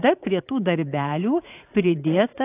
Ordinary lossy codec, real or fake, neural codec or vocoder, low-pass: AAC, 24 kbps; fake; vocoder, 44.1 kHz, 80 mel bands, Vocos; 3.6 kHz